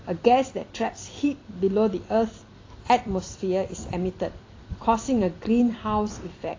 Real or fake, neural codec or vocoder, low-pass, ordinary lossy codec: fake; vocoder, 44.1 kHz, 128 mel bands every 256 samples, BigVGAN v2; 7.2 kHz; AAC, 32 kbps